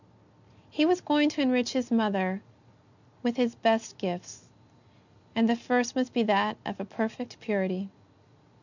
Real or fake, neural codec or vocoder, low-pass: real; none; 7.2 kHz